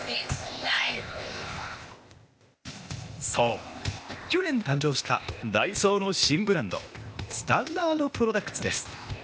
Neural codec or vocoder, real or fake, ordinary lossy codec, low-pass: codec, 16 kHz, 0.8 kbps, ZipCodec; fake; none; none